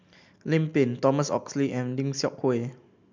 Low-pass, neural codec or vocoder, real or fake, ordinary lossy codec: 7.2 kHz; none; real; MP3, 64 kbps